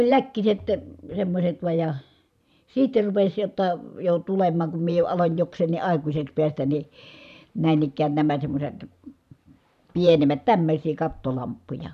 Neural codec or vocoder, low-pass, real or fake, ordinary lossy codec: vocoder, 44.1 kHz, 128 mel bands every 512 samples, BigVGAN v2; 14.4 kHz; fake; none